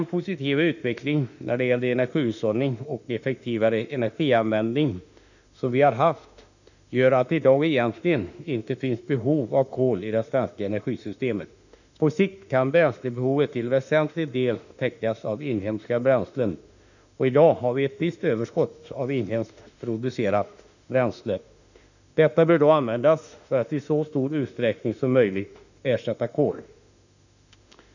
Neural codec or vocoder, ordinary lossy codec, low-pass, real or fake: autoencoder, 48 kHz, 32 numbers a frame, DAC-VAE, trained on Japanese speech; none; 7.2 kHz; fake